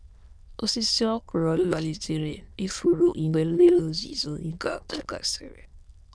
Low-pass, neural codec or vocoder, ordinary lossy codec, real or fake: none; autoencoder, 22.05 kHz, a latent of 192 numbers a frame, VITS, trained on many speakers; none; fake